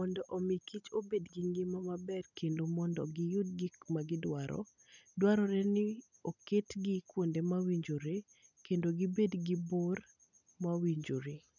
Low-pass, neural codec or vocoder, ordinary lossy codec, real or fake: 7.2 kHz; none; none; real